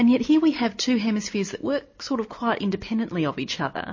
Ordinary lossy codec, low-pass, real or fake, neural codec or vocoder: MP3, 32 kbps; 7.2 kHz; real; none